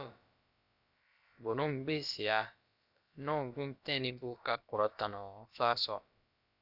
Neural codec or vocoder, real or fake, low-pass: codec, 16 kHz, about 1 kbps, DyCAST, with the encoder's durations; fake; 5.4 kHz